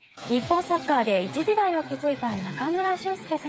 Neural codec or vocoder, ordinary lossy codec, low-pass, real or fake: codec, 16 kHz, 4 kbps, FreqCodec, smaller model; none; none; fake